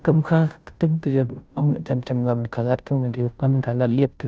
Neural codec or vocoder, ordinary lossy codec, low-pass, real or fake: codec, 16 kHz, 0.5 kbps, FunCodec, trained on Chinese and English, 25 frames a second; none; none; fake